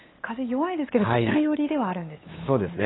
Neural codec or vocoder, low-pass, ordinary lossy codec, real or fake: codec, 16 kHz, 8 kbps, FunCodec, trained on LibriTTS, 25 frames a second; 7.2 kHz; AAC, 16 kbps; fake